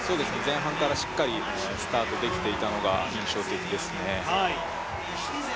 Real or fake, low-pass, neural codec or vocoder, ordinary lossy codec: real; none; none; none